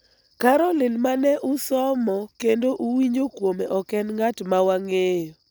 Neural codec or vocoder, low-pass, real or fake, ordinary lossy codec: none; none; real; none